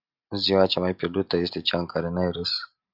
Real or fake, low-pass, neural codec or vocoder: real; 5.4 kHz; none